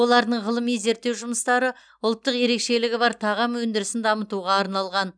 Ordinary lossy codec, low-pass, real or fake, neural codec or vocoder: none; 9.9 kHz; real; none